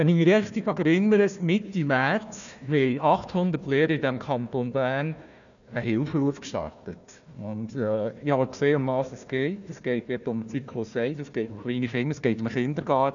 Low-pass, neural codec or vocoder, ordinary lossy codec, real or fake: 7.2 kHz; codec, 16 kHz, 1 kbps, FunCodec, trained on Chinese and English, 50 frames a second; none; fake